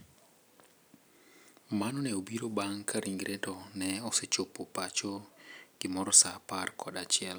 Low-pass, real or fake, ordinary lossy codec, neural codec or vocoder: none; real; none; none